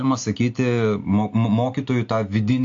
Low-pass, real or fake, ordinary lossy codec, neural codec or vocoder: 7.2 kHz; real; AAC, 48 kbps; none